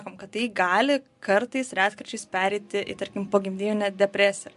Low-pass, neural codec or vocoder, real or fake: 10.8 kHz; none; real